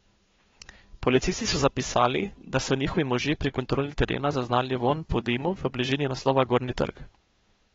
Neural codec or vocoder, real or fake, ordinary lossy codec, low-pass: codec, 16 kHz, 2 kbps, FunCodec, trained on Chinese and English, 25 frames a second; fake; AAC, 24 kbps; 7.2 kHz